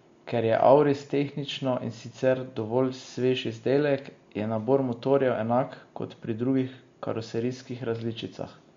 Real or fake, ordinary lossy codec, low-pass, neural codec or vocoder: real; MP3, 48 kbps; 7.2 kHz; none